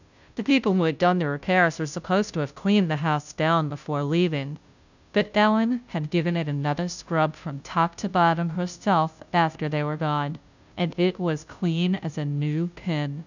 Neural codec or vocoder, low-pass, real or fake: codec, 16 kHz, 0.5 kbps, FunCodec, trained on Chinese and English, 25 frames a second; 7.2 kHz; fake